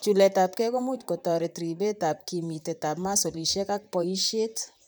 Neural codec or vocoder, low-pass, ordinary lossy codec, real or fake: vocoder, 44.1 kHz, 128 mel bands, Pupu-Vocoder; none; none; fake